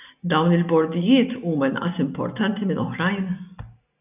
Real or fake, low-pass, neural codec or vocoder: real; 3.6 kHz; none